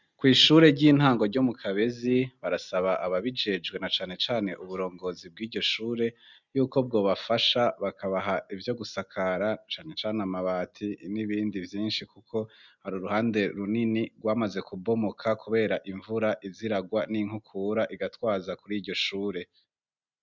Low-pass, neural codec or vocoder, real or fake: 7.2 kHz; none; real